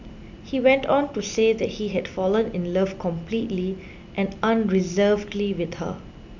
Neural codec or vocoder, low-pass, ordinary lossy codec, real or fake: none; 7.2 kHz; none; real